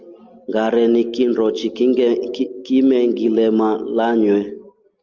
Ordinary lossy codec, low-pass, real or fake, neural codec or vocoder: Opus, 32 kbps; 7.2 kHz; real; none